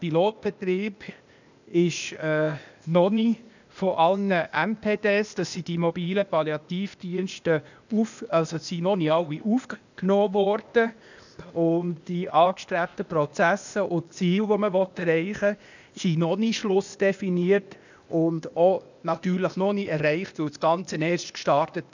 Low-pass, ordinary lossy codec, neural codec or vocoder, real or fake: 7.2 kHz; none; codec, 16 kHz, 0.8 kbps, ZipCodec; fake